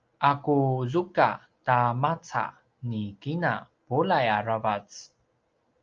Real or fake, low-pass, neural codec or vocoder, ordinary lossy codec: real; 7.2 kHz; none; Opus, 24 kbps